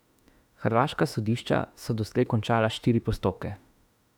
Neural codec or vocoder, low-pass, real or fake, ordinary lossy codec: autoencoder, 48 kHz, 32 numbers a frame, DAC-VAE, trained on Japanese speech; 19.8 kHz; fake; none